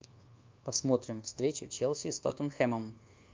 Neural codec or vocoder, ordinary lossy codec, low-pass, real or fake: codec, 24 kHz, 1.2 kbps, DualCodec; Opus, 32 kbps; 7.2 kHz; fake